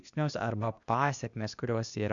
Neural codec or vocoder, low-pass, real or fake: codec, 16 kHz, 0.8 kbps, ZipCodec; 7.2 kHz; fake